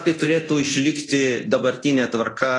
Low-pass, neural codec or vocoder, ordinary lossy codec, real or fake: 10.8 kHz; codec, 24 kHz, 0.9 kbps, DualCodec; AAC, 32 kbps; fake